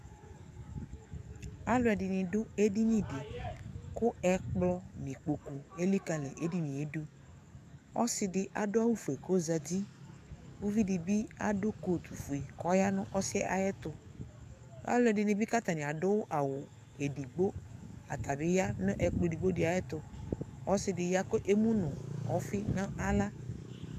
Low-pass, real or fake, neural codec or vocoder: 14.4 kHz; fake; codec, 44.1 kHz, 7.8 kbps, DAC